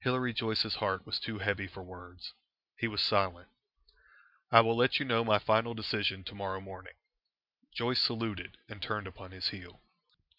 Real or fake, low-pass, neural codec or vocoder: real; 5.4 kHz; none